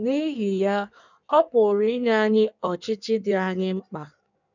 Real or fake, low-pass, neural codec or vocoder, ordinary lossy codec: fake; 7.2 kHz; codec, 16 kHz in and 24 kHz out, 1.1 kbps, FireRedTTS-2 codec; none